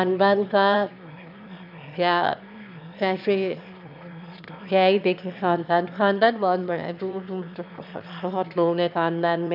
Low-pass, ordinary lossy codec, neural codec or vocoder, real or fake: 5.4 kHz; AAC, 48 kbps; autoencoder, 22.05 kHz, a latent of 192 numbers a frame, VITS, trained on one speaker; fake